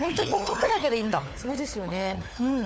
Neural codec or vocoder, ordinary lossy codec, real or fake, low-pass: codec, 16 kHz, 4 kbps, FunCodec, trained on LibriTTS, 50 frames a second; none; fake; none